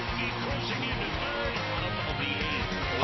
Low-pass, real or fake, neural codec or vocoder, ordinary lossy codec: 7.2 kHz; real; none; MP3, 24 kbps